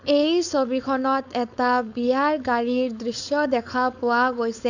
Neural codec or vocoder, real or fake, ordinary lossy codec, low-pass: codec, 16 kHz, 4.8 kbps, FACodec; fake; none; 7.2 kHz